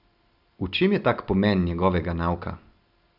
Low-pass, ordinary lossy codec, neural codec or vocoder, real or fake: 5.4 kHz; none; none; real